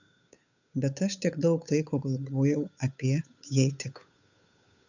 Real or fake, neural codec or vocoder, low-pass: fake; codec, 16 kHz, 8 kbps, FunCodec, trained on Chinese and English, 25 frames a second; 7.2 kHz